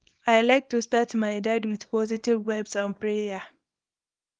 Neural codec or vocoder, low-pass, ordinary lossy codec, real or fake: codec, 24 kHz, 0.9 kbps, WavTokenizer, small release; 9.9 kHz; Opus, 24 kbps; fake